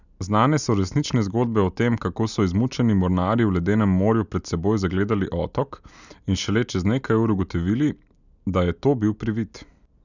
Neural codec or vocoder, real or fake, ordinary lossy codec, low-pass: none; real; none; 7.2 kHz